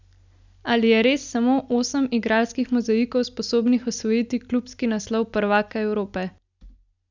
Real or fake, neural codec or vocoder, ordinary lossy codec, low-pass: real; none; none; 7.2 kHz